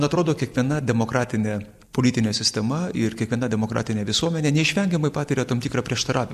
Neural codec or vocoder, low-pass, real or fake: none; 14.4 kHz; real